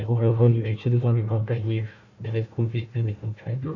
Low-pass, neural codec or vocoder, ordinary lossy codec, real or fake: 7.2 kHz; codec, 16 kHz, 1 kbps, FunCodec, trained on Chinese and English, 50 frames a second; none; fake